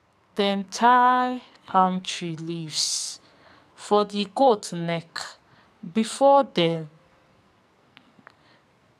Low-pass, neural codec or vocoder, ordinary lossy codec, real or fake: 14.4 kHz; codec, 44.1 kHz, 2.6 kbps, SNAC; none; fake